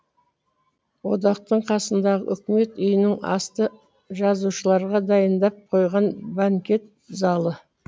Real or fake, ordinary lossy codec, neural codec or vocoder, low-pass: real; none; none; none